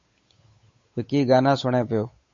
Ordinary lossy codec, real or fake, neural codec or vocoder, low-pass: MP3, 32 kbps; fake; codec, 16 kHz, 8 kbps, FunCodec, trained on Chinese and English, 25 frames a second; 7.2 kHz